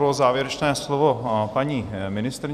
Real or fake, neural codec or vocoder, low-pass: fake; vocoder, 44.1 kHz, 128 mel bands every 256 samples, BigVGAN v2; 14.4 kHz